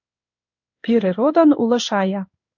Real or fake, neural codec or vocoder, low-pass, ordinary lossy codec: fake; codec, 16 kHz in and 24 kHz out, 1 kbps, XY-Tokenizer; 7.2 kHz; MP3, 64 kbps